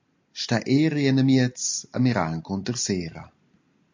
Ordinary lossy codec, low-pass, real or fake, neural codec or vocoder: MP3, 48 kbps; 7.2 kHz; real; none